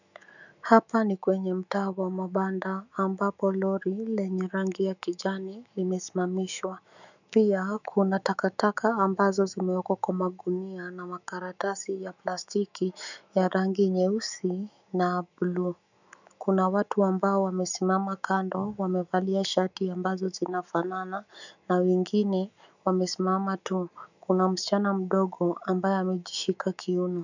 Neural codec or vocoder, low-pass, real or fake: autoencoder, 48 kHz, 128 numbers a frame, DAC-VAE, trained on Japanese speech; 7.2 kHz; fake